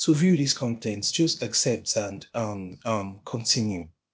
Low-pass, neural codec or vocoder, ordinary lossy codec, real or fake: none; codec, 16 kHz, 0.8 kbps, ZipCodec; none; fake